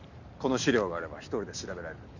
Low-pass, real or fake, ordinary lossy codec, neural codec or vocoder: 7.2 kHz; real; none; none